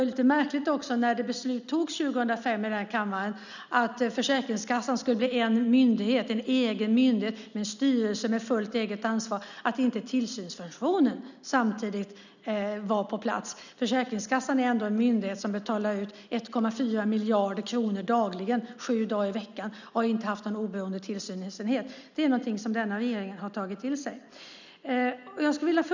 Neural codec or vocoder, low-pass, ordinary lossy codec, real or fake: none; 7.2 kHz; none; real